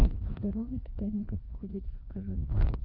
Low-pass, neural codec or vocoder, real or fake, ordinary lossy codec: 5.4 kHz; codec, 16 kHz, 2 kbps, FreqCodec, larger model; fake; Opus, 32 kbps